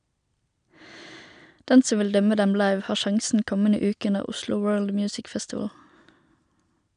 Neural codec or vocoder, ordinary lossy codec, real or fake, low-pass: none; none; real; 9.9 kHz